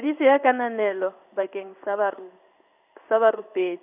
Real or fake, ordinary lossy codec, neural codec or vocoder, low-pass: fake; none; codec, 16 kHz in and 24 kHz out, 1 kbps, XY-Tokenizer; 3.6 kHz